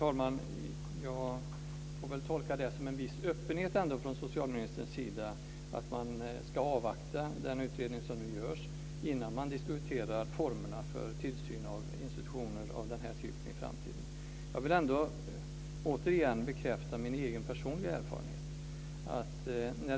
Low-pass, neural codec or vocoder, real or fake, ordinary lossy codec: none; none; real; none